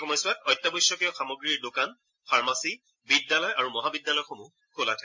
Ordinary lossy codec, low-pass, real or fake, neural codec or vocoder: MP3, 48 kbps; 7.2 kHz; real; none